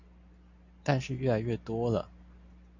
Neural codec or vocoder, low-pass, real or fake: none; 7.2 kHz; real